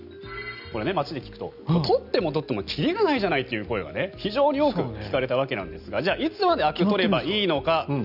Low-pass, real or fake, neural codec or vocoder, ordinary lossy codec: 5.4 kHz; real; none; none